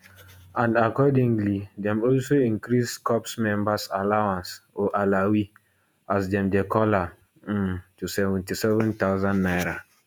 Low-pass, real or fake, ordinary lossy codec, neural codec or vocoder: none; fake; none; vocoder, 48 kHz, 128 mel bands, Vocos